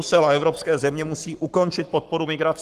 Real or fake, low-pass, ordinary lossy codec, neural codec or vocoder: fake; 14.4 kHz; Opus, 24 kbps; codec, 44.1 kHz, 7.8 kbps, Pupu-Codec